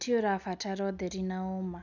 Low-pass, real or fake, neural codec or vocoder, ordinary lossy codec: 7.2 kHz; real; none; none